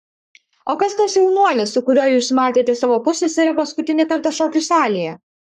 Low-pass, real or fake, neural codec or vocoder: 14.4 kHz; fake; codec, 44.1 kHz, 3.4 kbps, Pupu-Codec